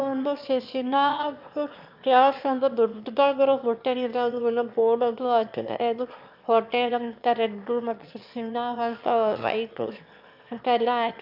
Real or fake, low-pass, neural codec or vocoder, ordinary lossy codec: fake; 5.4 kHz; autoencoder, 22.05 kHz, a latent of 192 numbers a frame, VITS, trained on one speaker; MP3, 48 kbps